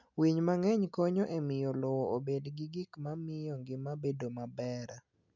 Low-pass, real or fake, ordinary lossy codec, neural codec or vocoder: 7.2 kHz; real; none; none